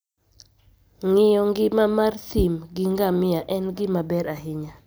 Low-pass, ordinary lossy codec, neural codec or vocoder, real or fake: none; none; none; real